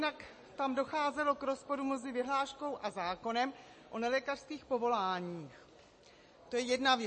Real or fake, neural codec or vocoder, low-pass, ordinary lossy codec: real; none; 9.9 kHz; MP3, 32 kbps